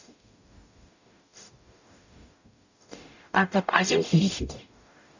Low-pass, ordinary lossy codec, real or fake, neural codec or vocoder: 7.2 kHz; none; fake; codec, 44.1 kHz, 0.9 kbps, DAC